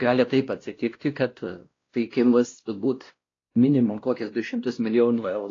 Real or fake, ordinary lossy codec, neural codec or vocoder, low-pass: fake; AAC, 32 kbps; codec, 16 kHz, 1 kbps, X-Codec, WavLM features, trained on Multilingual LibriSpeech; 7.2 kHz